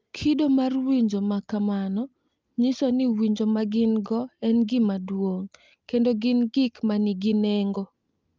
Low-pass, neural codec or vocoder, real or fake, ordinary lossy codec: 7.2 kHz; none; real; Opus, 24 kbps